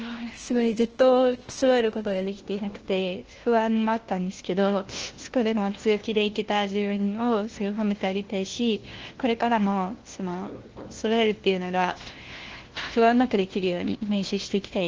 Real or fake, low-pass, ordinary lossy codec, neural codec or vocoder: fake; 7.2 kHz; Opus, 16 kbps; codec, 16 kHz, 1 kbps, FunCodec, trained on LibriTTS, 50 frames a second